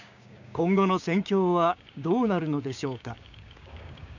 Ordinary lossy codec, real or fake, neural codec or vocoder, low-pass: none; fake; codec, 16 kHz, 6 kbps, DAC; 7.2 kHz